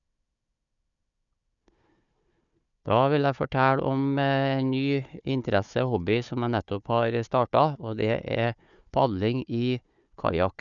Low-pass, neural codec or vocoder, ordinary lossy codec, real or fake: 7.2 kHz; codec, 16 kHz, 4 kbps, FunCodec, trained on Chinese and English, 50 frames a second; none; fake